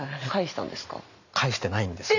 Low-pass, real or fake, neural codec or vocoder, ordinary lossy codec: 7.2 kHz; real; none; none